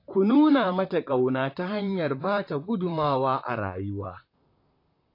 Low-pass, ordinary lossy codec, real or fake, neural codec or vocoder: 5.4 kHz; AAC, 32 kbps; fake; vocoder, 44.1 kHz, 128 mel bands, Pupu-Vocoder